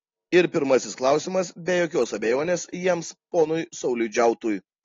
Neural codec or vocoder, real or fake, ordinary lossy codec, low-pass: none; real; AAC, 32 kbps; 7.2 kHz